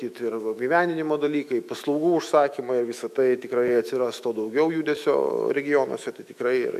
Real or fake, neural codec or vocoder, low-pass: fake; autoencoder, 48 kHz, 128 numbers a frame, DAC-VAE, trained on Japanese speech; 14.4 kHz